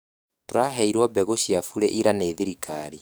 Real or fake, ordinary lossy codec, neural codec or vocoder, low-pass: fake; none; codec, 44.1 kHz, 7.8 kbps, DAC; none